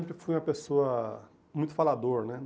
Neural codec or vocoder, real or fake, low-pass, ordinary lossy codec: none; real; none; none